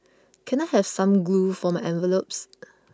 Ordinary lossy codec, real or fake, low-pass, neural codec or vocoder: none; real; none; none